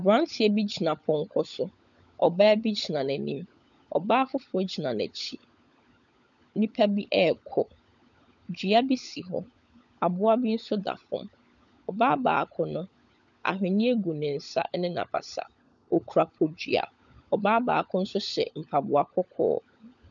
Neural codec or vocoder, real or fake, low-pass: codec, 16 kHz, 16 kbps, FunCodec, trained on LibriTTS, 50 frames a second; fake; 7.2 kHz